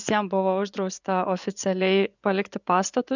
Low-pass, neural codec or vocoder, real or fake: 7.2 kHz; vocoder, 24 kHz, 100 mel bands, Vocos; fake